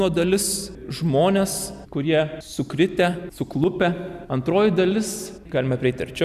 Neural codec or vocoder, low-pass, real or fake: none; 14.4 kHz; real